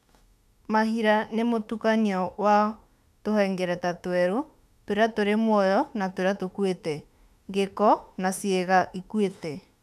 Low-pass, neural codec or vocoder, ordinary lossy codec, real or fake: 14.4 kHz; autoencoder, 48 kHz, 32 numbers a frame, DAC-VAE, trained on Japanese speech; none; fake